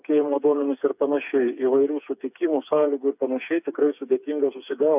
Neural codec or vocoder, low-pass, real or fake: codec, 16 kHz, 4 kbps, FreqCodec, smaller model; 3.6 kHz; fake